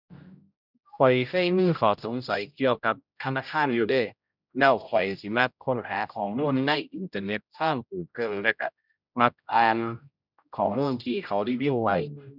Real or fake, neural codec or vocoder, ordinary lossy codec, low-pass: fake; codec, 16 kHz, 0.5 kbps, X-Codec, HuBERT features, trained on general audio; none; 5.4 kHz